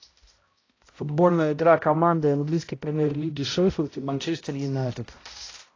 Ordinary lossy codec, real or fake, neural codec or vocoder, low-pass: AAC, 32 kbps; fake; codec, 16 kHz, 0.5 kbps, X-Codec, HuBERT features, trained on balanced general audio; 7.2 kHz